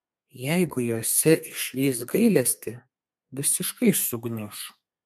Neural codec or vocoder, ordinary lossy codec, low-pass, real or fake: codec, 32 kHz, 1.9 kbps, SNAC; MP3, 96 kbps; 14.4 kHz; fake